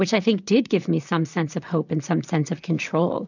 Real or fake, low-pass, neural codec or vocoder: fake; 7.2 kHz; vocoder, 44.1 kHz, 128 mel bands, Pupu-Vocoder